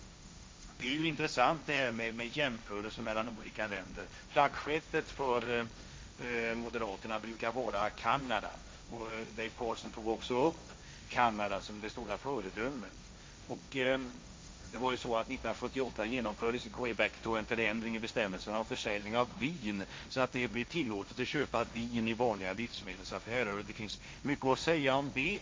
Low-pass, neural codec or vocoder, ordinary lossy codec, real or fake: none; codec, 16 kHz, 1.1 kbps, Voila-Tokenizer; none; fake